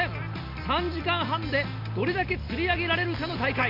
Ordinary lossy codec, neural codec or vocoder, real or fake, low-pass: none; none; real; 5.4 kHz